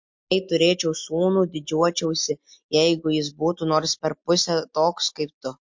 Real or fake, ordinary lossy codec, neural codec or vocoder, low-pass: real; MP3, 48 kbps; none; 7.2 kHz